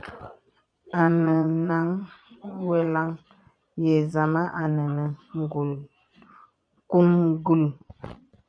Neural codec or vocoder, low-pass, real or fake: vocoder, 22.05 kHz, 80 mel bands, Vocos; 9.9 kHz; fake